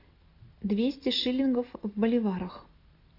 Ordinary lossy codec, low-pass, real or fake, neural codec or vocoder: AAC, 32 kbps; 5.4 kHz; real; none